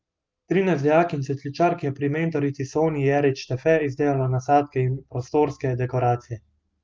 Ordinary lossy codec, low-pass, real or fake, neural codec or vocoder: Opus, 32 kbps; 7.2 kHz; real; none